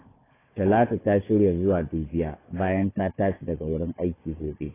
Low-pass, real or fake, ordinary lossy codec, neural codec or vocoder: 3.6 kHz; fake; AAC, 16 kbps; codec, 16 kHz, 4 kbps, FunCodec, trained on Chinese and English, 50 frames a second